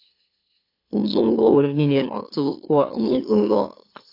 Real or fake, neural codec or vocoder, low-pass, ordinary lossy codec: fake; autoencoder, 44.1 kHz, a latent of 192 numbers a frame, MeloTTS; 5.4 kHz; AAC, 32 kbps